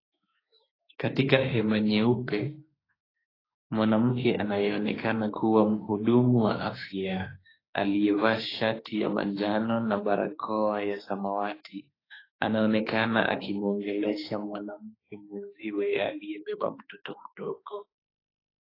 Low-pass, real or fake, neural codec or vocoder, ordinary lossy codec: 5.4 kHz; fake; autoencoder, 48 kHz, 32 numbers a frame, DAC-VAE, trained on Japanese speech; AAC, 24 kbps